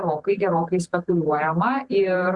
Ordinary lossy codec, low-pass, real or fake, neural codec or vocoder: Opus, 64 kbps; 10.8 kHz; fake; vocoder, 44.1 kHz, 128 mel bands every 512 samples, BigVGAN v2